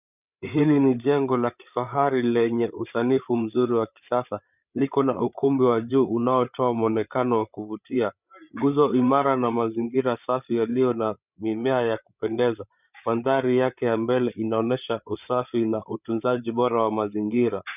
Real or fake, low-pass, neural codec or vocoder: fake; 3.6 kHz; codec, 16 kHz, 8 kbps, FreqCodec, larger model